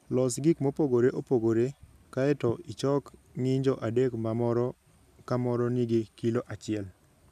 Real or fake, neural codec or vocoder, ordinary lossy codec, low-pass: real; none; none; 14.4 kHz